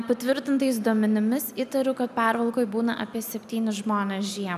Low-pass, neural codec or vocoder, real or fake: 14.4 kHz; none; real